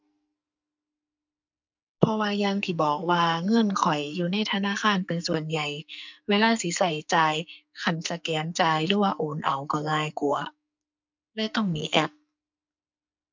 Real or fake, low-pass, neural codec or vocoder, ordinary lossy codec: fake; 7.2 kHz; codec, 44.1 kHz, 2.6 kbps, SNAC; MP3, 64 kbps